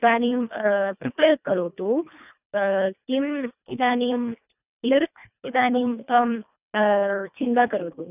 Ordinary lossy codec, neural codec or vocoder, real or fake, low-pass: none; codec, 24 kHz, 1.5 kbps, HILCodec; fake; 3.6 kHz